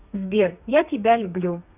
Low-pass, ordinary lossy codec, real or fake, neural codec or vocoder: 3.6 kHz; none; fake; codec, 32 kHz, 1.9 kbps, SNAC